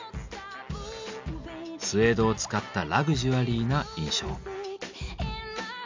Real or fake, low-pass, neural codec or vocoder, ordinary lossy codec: real; 7.2 kHz; none; MP3, 64 kbps